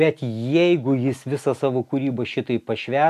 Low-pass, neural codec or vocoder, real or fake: 14.4 kHz; none; real